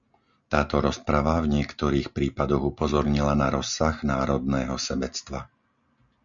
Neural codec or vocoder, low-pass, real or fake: none; 7.2 kHz; real